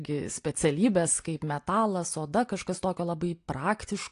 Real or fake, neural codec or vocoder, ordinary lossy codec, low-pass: real; none; AAC, 48 kbps; 10.8 kHz